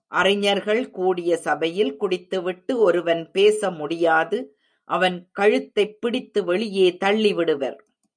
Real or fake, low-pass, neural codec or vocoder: real; 9.9 kHz; none